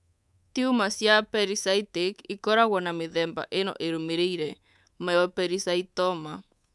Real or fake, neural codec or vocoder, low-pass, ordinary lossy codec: fake; codec, 24 kHz, 3.1 kbps, DualCodec; none; none